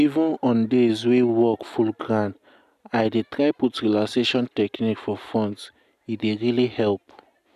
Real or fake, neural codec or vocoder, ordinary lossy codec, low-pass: real; none; none; 14.4 kHz